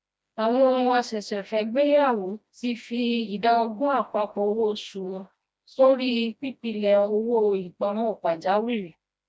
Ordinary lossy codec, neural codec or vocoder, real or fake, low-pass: none; codec, 16 kHz, 1 kbps, FreqCodec, smaller model; fake; none